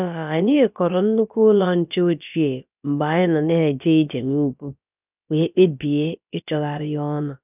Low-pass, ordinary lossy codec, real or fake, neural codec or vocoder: 3.6 kHz; none; fake; codec, 16 kHz, about 1 kbps, DyCAST, with the encoder's durations